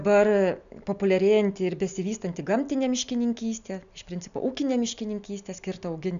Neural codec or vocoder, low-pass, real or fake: none; 7.2 kHz; real